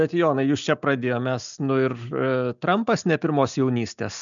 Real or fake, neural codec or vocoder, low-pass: real; none; 7.2 kHz